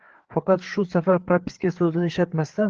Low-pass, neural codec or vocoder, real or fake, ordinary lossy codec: 7.2 kHz; codec, 16 kHz, 4 kbps, FreqCodec, larger model; fake; Opus, 16 kbps